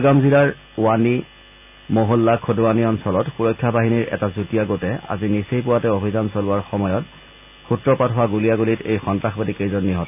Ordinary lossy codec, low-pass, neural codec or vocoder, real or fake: none; 3.6 kHz; none; real